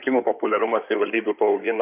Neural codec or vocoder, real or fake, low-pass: codec, 16 kHz in and 24 kHz out, 2.2 kbps, FireRedTTS-2 codec; fake; 3.6 kHz